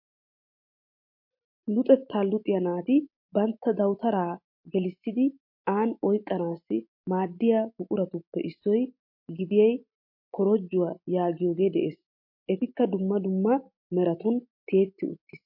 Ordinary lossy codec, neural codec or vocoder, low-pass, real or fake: MP3, 32 kbps; none; 5.4 kHz; real